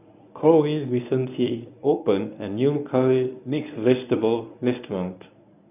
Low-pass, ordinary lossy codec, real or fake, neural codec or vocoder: 3.6 kHz; none; fake; codec, 24 kHz, 0.9 kbps, WavTokenizer, medium speech release version 1